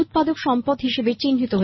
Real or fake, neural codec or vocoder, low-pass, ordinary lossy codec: real; none; 7.2 kHz; MP3, 24 kbps